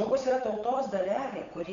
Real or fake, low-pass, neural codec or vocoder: fake; 7.2 kHz; codec, 16 kHz, 8 kbps, FunCodec, trained on Chinese and English, 25 frames a second